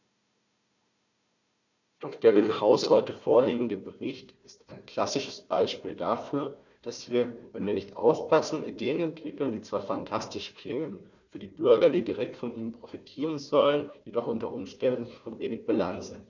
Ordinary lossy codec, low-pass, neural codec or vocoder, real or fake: none; 7.2 kHz; codec, 16 kHz, 1 kbps, FunCodec, trained on Chinese and English, 50 frames a second; fake